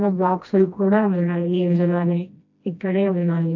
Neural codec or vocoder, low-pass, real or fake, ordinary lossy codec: codec, 16 kHz, 1 kbps, FreqCodec, smaller model; 7.2 kHz; fake; AAC, 48 kbps